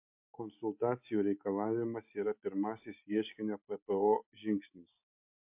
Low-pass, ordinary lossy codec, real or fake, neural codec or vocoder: 3.6 kHz; MP3, 32 kbps; real; none